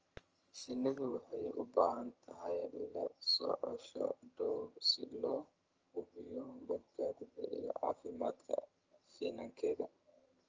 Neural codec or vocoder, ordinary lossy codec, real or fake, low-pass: vocoder, 22.05 kHz, 80 mel bands, HiFi-GAN; Opus, 24 kbps; fake; 7.2 kHz